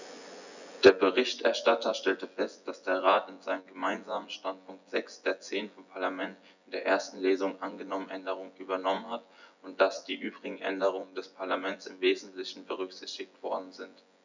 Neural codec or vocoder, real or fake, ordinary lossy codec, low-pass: vocoder, 24 kHz, 100 mel bands, Vocos; fake; none; 7.2 kHz